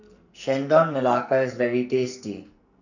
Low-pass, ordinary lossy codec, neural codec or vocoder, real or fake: 7.2 kHz; none; codec, 44.1 kHz, 2.6 kbps, SNAC; fake